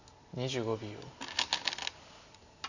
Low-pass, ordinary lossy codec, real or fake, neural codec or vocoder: 7.2 kHz; none; real; none